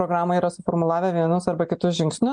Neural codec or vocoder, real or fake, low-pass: none; real; 9.9 kHz